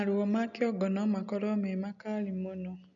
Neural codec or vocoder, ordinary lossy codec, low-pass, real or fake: none; none; 7.2 kHz; real